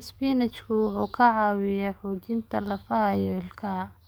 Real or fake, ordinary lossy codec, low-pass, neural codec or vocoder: fake; none; none; codec, 44.1 kHz, 7.8 kbps, DAC